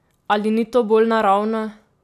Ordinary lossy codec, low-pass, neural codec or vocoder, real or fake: none; 14.4 kHz; none; real